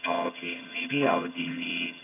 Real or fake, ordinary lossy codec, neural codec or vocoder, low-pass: fake; AAC, 32 kbps; vocoder, 22.05 kHz, 80 mel bands, HiFi-GAN; 3.6 kHz